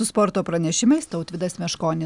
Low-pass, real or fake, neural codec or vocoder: 10.8 kHz; real; none